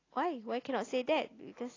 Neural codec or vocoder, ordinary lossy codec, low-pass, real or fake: none; AAC, 32 kbps; 7.2 kHz; real